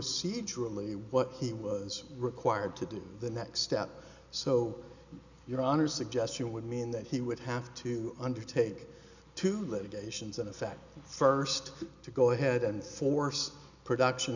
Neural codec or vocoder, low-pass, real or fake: none; 7.2 kHz; real